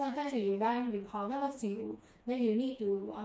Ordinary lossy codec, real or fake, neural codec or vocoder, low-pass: none; fake; codec, 16 kHz, 2 kbps, FreqCodec, smaller model; none